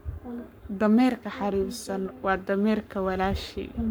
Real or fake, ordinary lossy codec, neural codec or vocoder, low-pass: fake; none; codec, 44.1 kHz, 7.8 kbps, Pupu-Codec; none